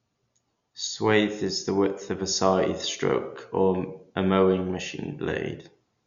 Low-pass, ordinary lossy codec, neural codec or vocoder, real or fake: 7.2 kHz; none; none; real